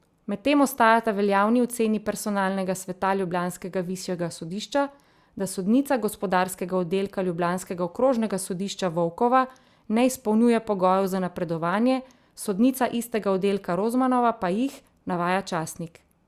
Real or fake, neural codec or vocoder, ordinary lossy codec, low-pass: real; none; Opus, 64 kbps; 14.4 kHz